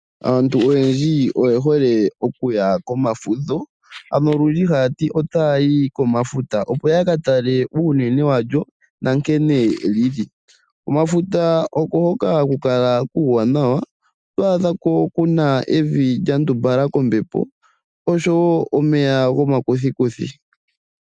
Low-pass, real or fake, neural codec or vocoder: 9.9 kHz; real; none